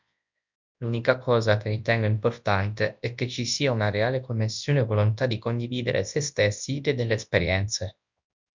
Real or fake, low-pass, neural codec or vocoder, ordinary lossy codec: fake; 7.2 kHz; codec, 24 kHz, 0.9 kbps, WavTokenizer, large speech release; MP3, 64 kbps